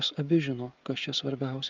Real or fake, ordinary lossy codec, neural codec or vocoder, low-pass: fake; Opus, 32 kbps; codec, 16 kHz, 16 kbps, FreqCodec, smaller model; 7.2 kHz